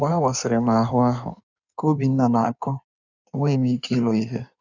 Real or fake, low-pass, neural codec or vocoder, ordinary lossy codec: fake; 7.2 kHz; codec, 16 kHz in and 24 kHz out, 1.1 kbps, FireRedTTS-2 codec; none